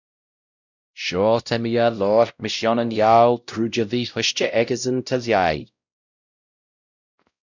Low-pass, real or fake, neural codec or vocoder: 7.2 kHz; fake; codec, 16 kHz, 0.5 kbps, X-Codec, WavLM features, trained on Multilingual LibriSpeech